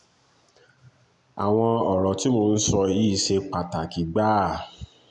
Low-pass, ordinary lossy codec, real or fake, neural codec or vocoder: 10.8 kHz; none; real; none